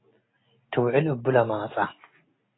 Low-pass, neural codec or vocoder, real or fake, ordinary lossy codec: 7.2 kHz; none; real; AAC, 16 kbps